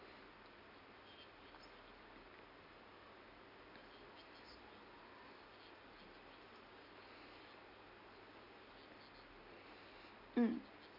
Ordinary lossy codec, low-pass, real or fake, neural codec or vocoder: none; 5.4 kHz; real; none